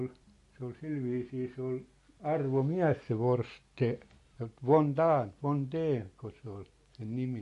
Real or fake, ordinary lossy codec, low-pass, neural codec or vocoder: real; MP3, 48 kbps; 14.4 kHz; none